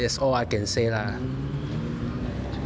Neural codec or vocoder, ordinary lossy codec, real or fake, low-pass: none; none; real; none